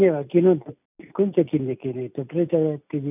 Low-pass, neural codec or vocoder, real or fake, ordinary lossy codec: 3.6 kHz; none; real; none